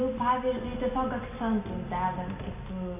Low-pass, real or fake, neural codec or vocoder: 3.6 kHz; real; none